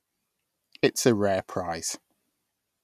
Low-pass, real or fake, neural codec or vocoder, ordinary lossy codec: 14.4 kHz; real; none; none